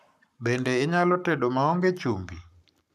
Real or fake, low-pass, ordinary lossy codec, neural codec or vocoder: fake; 14.4 kHz; none; codec, 44.1 kHz, 7.8 kbps, Pupu-Codec